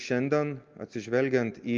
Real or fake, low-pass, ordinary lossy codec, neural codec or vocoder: real; 7.2 kHz; Opus, 32 kbps; none